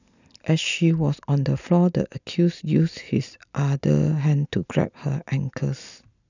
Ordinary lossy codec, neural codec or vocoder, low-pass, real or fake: none; none; 7.2 kHz; real